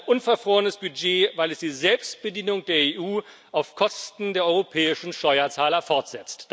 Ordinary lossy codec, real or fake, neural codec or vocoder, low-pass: none; real; none; none